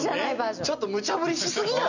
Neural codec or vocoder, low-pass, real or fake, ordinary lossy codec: none; 7.2 kHz; real; none